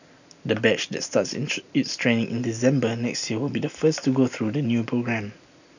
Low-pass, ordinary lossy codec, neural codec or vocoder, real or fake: 7.2 kHz; none; none; real